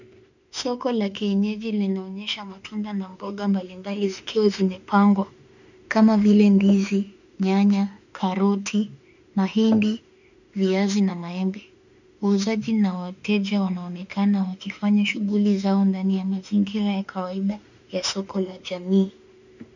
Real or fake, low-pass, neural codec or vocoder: fake; 7.2 kHz; autoencoder, 48 kHz, 32 numbers a frame, DAC-VAE, trained on Japanese speech